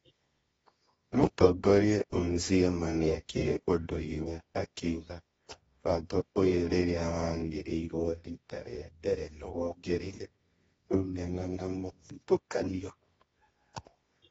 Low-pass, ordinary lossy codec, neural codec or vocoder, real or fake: 10.8 kHz; AAC, 24 kbps; codec, 24 kHz, 0.9 kbps, WavTokenizer, medium music audio release; fake